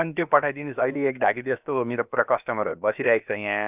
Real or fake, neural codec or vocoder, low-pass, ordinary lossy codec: fake; codec, 16 kHz, about 1 kbps, DyCAST, with the encoder's durations; 3.6 kHz; none